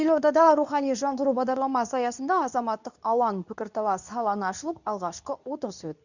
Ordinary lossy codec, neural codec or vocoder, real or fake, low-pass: none; codec, 24 kHz, 0.9 kbps, WavTokenizer, medium speech release version 2; fake; 7.2 kHz